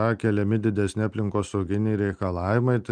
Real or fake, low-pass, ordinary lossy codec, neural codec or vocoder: real; 9.9 kHz; Opus, 32 kbps; none